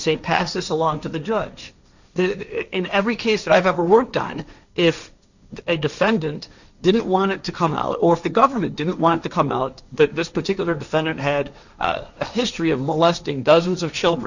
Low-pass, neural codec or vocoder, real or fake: 7.2 kHz; codec, 16 kHz, 1.1 kbps, Voila-Tokenizer; fake